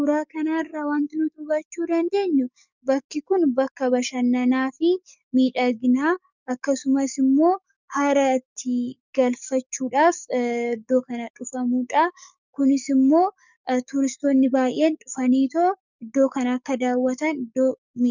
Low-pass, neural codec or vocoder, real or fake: 7.2 kHz; codec, 44.1 kHz, 7.8 kbps, DAC; fake